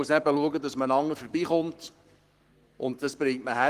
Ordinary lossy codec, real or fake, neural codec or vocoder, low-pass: Opus, 24 kbps; fake; codec, 44.1 kHz, 7.8 kbps, Pupu-Codec; 14.4 kHz